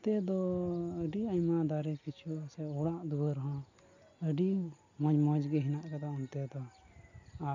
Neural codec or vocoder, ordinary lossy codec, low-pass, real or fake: none; none; 7.2 kHz; real